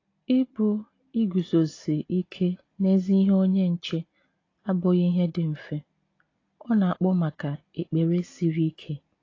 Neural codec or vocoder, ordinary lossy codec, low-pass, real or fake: none; AAC, 32 kbps; 7.2 kHz; real